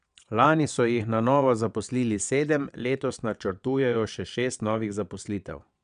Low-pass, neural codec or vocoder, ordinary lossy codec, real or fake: 9.9 kHz; vocoder, 22.05 kHz, 80 mel bands, WaveNeXt; none; fake